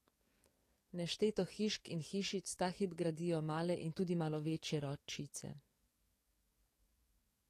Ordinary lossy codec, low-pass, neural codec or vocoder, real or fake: AAC, 48 kbps; 14.4 kHz; codec, 44.1 kHz, 7.8 kbps, DAC; fake